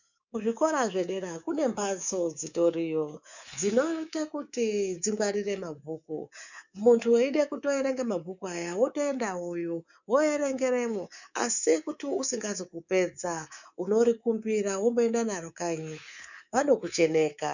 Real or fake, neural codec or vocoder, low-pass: fake; codec, 24 kHz, 3.1 kbps, DualCodec; 7.2 kHz